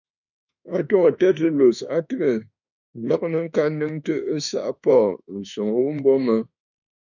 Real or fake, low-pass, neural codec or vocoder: fake; 7.2 kHz; autoencoder, 48 kHz, 32 numbers a frame, DAC-VAE, trained on Japanese speech